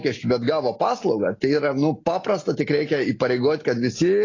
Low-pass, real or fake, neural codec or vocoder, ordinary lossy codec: 7.2 kHz; real; none; AAC, 32 kbps